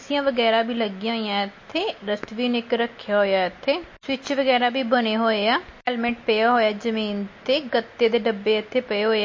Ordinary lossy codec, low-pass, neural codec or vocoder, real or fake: MP3, 32 kbps; 7.2 kHz; none; real